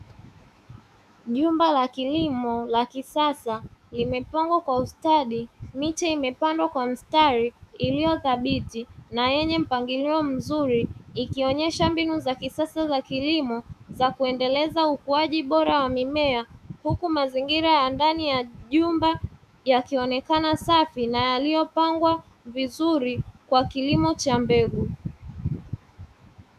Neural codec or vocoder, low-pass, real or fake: autoencoder, 48 kHz, 128 numbers a frame, DAC-VAE, trained on Japanese speech; 14.4 kHz; fake